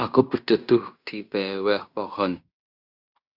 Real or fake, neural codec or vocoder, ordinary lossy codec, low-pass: fake; codec, 16 kHz in and 24 kHz out, 0.9 kbps, LongCat-Audio-Codec, fine tuned four codebook decoder; Opus, 64 kbps; 5.4 kHz